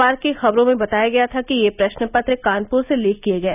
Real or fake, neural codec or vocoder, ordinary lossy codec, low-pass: real; none; none; 3.6 kHz